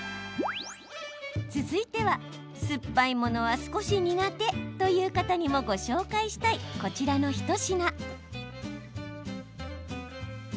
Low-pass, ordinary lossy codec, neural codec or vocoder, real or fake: none; none; none; real